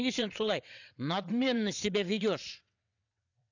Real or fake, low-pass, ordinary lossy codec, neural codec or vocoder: fake; 7.2 kHz; none; vocoder, 22.05 kHz, 80 mel bands, WaveNeXt